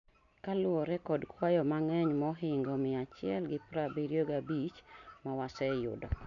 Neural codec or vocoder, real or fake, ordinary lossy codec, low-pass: none; real; none; 7.2 kHz